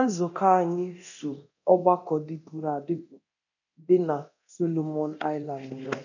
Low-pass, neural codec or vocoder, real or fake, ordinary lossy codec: 7.2 kHz; codec, 24 kHz, 0.9 kbps, DualCodec; fake; none